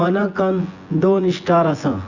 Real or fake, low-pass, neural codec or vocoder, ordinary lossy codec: fake; 7.2 kHz; vocoder, 24 kHz, 100 mel bands, Vocos; Opus, 64 kbps